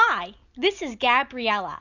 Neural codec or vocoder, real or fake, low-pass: none; real; 7.2 kHz